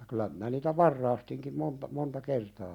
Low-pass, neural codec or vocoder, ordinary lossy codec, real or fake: 19.8 kHz; none; none; real